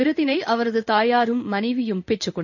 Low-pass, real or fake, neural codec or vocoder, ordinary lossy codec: 7.2 kHz; fake; codec, 16 kHz in and 24 kHz out, 1 kbps, XY-Tokenizer; none